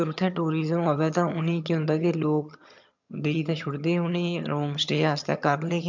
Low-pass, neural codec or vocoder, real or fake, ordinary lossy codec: 7.2 kHz; vocoder, 22.05 kHz, 80 mel bands, HiFi-GAN; fake; MP3, 64 kbps